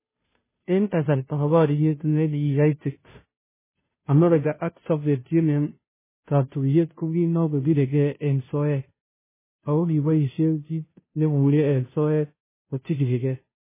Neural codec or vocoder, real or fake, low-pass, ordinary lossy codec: codec, 16 kHz, 0.5 kbps, FunCodec, trained on Chinese and English, 25 frames a second; fake; 3.6 kHz; MP3, 16 kbps